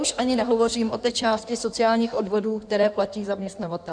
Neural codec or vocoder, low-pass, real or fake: codec, 16 kHz in and 24 kHz out, 1.1 kbps, FireRedTTS-2 codec; 9.9 kHz; fake